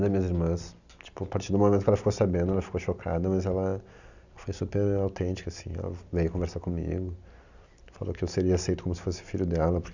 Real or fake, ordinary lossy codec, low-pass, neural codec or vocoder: real; none; 7.2 kHz; none